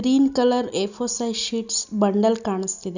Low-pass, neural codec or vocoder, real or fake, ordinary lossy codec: 7.2 kHz; none; real; none